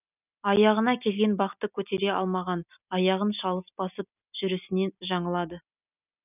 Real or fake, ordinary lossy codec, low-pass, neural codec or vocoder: real; none; 3.6 kHz; none